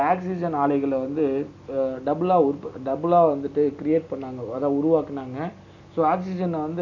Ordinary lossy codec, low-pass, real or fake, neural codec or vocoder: none; 7.2 kHz; real; none